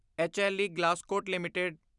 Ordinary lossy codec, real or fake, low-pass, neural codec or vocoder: none; real; 10.8 kHz; none